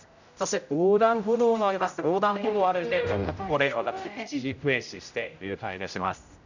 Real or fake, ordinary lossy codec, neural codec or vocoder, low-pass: fake; none; codec, 16 kHz, 0.5 kbps, X-Codec, HuBERT features, trained on general audio; 7.2 kHz